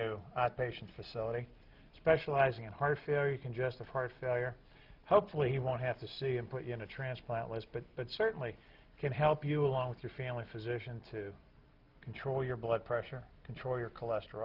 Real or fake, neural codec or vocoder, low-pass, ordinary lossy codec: real; none; 5.4 kHz; Opus, 24 kbps